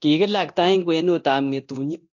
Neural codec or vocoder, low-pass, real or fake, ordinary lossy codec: codec, 24 kHz, 0.9 kbps, DualCodec; 7.2 kHz; fake; AAC, 48 kbps